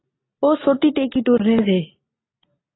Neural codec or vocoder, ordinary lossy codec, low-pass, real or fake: none; AAC, 16 kbps; 7.2 kHz; real